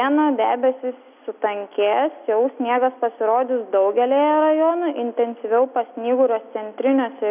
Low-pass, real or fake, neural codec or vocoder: 3.6 kHz; real; none